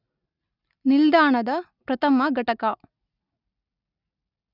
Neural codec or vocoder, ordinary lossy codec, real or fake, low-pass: none; none; real; 5.4 kHz